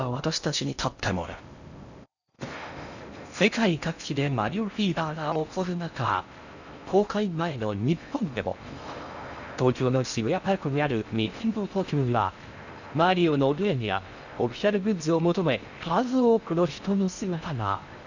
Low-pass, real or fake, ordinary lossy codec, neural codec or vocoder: 7.2 kHz; fake; none; codec, 16 kHz in and 24 kHz out, 0.6 kbps, FocalCodec, streaming, 2048 codes